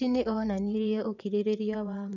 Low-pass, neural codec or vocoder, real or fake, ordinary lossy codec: 7.2 kHz; vocoder, 22.05 kHz, 80 mel bands, WaveNeXt; fake; none